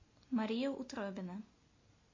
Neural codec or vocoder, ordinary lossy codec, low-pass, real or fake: none; MP3, 32 kbps; 7.2 kHz; real